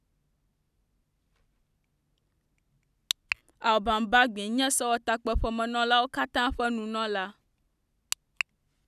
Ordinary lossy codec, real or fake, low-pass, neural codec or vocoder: none; real; 14.4 kHz; none